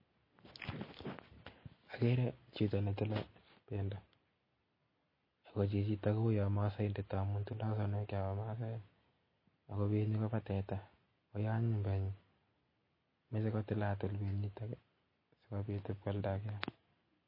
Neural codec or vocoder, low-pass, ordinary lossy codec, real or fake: none; 5.4 kHz; MP3, 24 kbps; real